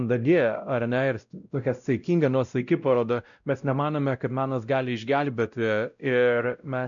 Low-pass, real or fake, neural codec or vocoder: 7.2 kHz; fake; codec, 16 kHz, 0.5 kbps, X-Codec, WavLM features, trained on Multilingual LibriSpeech